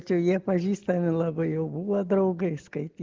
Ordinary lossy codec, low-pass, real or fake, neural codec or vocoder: Opus, 16 kbps; 7.2 kHz; real; none